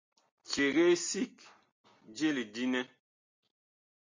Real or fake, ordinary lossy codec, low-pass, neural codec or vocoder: real; MP3, 48 kbps; 7.2 kHz; none